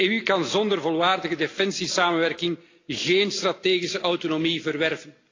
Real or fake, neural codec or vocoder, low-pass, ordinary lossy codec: real; none; 7.2 kHz; AAC, 32 kbps